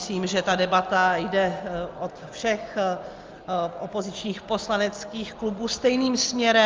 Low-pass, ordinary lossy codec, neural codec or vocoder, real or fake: 7.2 kHz; Opus, 32 kbps; none; real